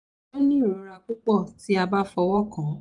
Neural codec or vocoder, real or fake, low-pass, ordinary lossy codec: vocoder, 44.1 kHz, 128 mel bands every 512 samples, BigVGAN v2; fake; 10.8 kHz; MP3, 96 kbps